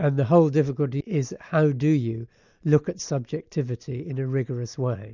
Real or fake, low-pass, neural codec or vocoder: real; 7.2 kHz; none